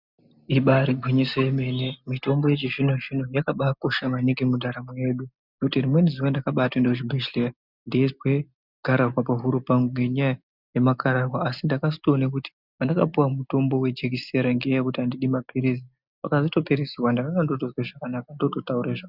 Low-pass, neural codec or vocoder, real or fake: 5.4 kHz; none; real